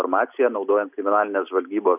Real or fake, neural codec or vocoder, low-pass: real; none; 3.6 kHz